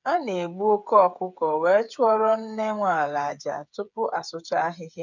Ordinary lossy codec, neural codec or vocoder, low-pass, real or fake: none; codec, 16 kHz, 8 kbps, FreqCodec, smaller model; 7.2 kHz; fake